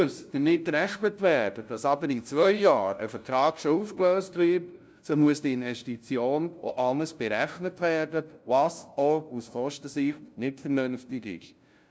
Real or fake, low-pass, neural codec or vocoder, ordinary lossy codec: fake; none; codec, 16 kHz, 0.5 kbps, FunCodec, trained on LibriTTS, 25 frames a second; none